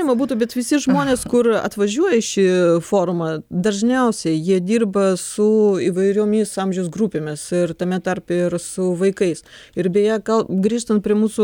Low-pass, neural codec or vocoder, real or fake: 19.8 kHz; none; real